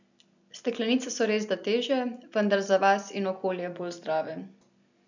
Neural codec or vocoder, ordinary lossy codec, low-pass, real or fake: none; none; 7.2 kHz; real